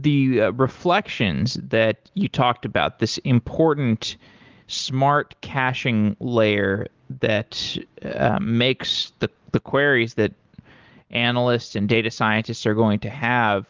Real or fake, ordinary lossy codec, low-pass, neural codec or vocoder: real; Opus, 32 kbps; 7.2 kHz; none